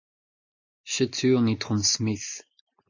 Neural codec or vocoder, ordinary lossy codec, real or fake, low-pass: none; AAC, 48 kbps; real; 7.2 kHz